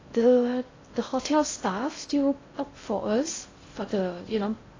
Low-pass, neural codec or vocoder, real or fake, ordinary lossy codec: 7.2 kHz; codec, 16 kHz in and 24 kHz out, 0.6 kbps, FocalCodec, streaming, 2048 codes; fake; AAC, 32 kbps